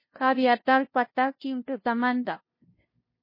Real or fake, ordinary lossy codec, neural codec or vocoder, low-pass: fake; MP3, 24 kbps; codec, 16 kHz, 0.5 kbps, FunCodec, trained on LibriTTS, 25 frames a second; 5.4 kHz